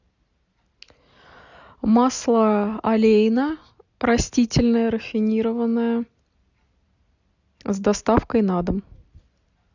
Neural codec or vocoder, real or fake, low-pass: none; real; 7.2 kHz